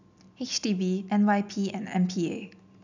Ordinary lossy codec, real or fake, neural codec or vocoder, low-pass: none; real; none; 7.2 kHz